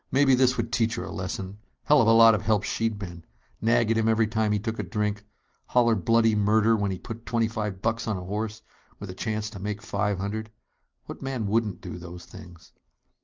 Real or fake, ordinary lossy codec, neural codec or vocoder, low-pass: real; Opus, 24 kbps; none; 7.2 kHz